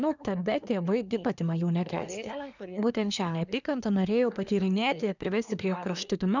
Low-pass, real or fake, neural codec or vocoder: 7.2 kHz; fake; codec, 24 kHz, 1 kbps, SNAC